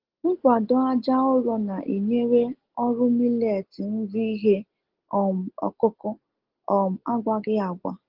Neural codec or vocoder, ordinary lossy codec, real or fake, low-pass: none; Opus, 16 kbps; real; 5.4 kHz